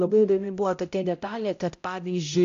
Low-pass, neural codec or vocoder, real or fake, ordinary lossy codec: 7.2 kHz; codec, 16 kHz, 0.5 kbps, X-Codec, HuBERT features, trained on balanced general audio; fake; AAC, 48 kbps